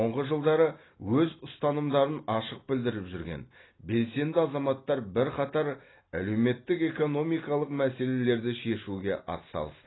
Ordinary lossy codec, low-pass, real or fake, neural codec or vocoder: AAC, 16 kbps; 7.2 kHz; real; none